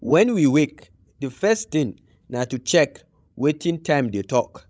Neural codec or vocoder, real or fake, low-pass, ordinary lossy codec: codec, 16 kHz, 16 kbps, FreqCodec, larger model; fake; none; none